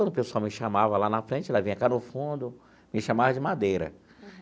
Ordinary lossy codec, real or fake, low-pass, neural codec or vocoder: none; real; none; none